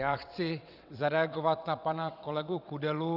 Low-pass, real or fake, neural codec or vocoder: 5.4 kHz; fake; vocoder, 22.05 kHz, 80 mel bands, WaveNeXt